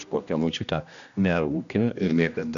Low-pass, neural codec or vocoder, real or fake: 7.2 kHz; codec, 16 kHz, 1 kbps, X-Codec, HuBERT features, trained on general audio; fake